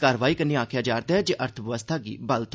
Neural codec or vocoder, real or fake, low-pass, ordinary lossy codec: none; real; none; none